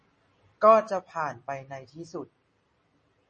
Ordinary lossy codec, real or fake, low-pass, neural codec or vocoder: MP3, 32 kbps; real; 10.8 kHz; none